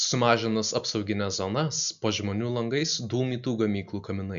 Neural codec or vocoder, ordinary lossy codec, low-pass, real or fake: none; AAC, 96 kbps; 7.2 kHz; real